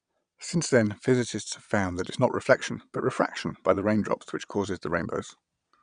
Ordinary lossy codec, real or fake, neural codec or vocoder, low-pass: MP3, 96 kbps; fake; vocoder, 22.05 kHz, 80 mel bands, Vocos; 9.9 kHz